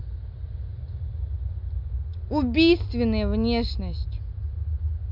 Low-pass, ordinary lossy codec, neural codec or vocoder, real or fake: 5.4 kHz; none; none; real